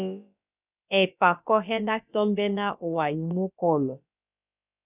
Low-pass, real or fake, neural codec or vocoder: 3.6 kHz; fake; codec, 16 kHz, about 1 kbps, DyCAST, with the encoder's durations